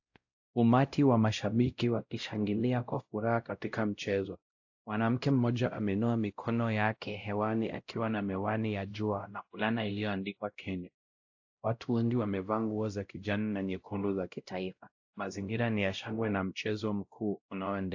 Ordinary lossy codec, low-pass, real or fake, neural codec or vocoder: AAC, 48 kbps; 7.2 kHz; fake; codec, 16 kHz, 0.5 kbps, X-Codec, WavLM features, trained on Multilingual LibriSpeech